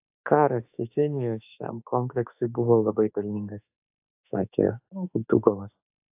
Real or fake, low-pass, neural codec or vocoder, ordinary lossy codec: fake; 3.6 kHz; autoencoder, 48 kHz, 32 numbers a frame, DAC-VAE, trained on Japanese speech; AAC, 32 kbps